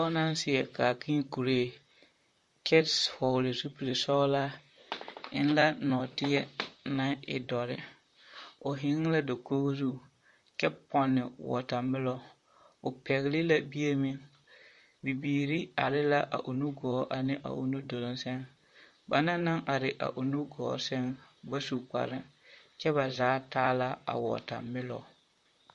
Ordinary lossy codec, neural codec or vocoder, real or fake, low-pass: MP3, 48 kbps; vocoder, 44.1 kHz, 128 mel bands, Pupu-Vocoder; fake; 14.4 kHz